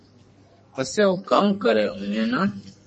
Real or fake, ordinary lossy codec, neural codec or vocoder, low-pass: fake; MP3, 32 kbps; codec, 44.1 kHz, 2.6 kbps, SNAC; 10.8 kHz